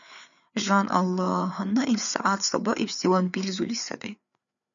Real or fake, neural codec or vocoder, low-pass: fake; codec, 16 kHz, 4 kbps, FreqCodec, larger model; 7.2 kHz